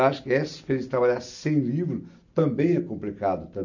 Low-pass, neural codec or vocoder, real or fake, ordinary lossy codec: 7.2 kHz; none; real; none